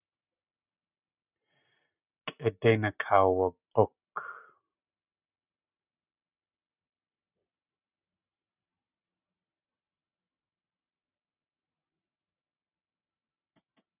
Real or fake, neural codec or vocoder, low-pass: real; none; 3.6 kHz